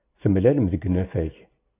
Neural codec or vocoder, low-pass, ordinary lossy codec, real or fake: none; 3.6 kHz; AAC, 24 kbps; real